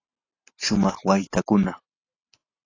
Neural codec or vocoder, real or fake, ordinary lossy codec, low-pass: none; real; AAC, 32 kbps; 7.2 kHz